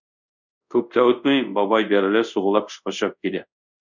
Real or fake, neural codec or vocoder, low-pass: fake; codec, 24 kHz, 0.5 kbps, DualCodec; 7.2 kHz